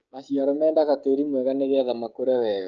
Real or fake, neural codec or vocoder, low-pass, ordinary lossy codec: fake; codec, 16 kHz, 16 kbps, FreqCodec, smaller model; 7.2 kHz; Opus, 24 kbps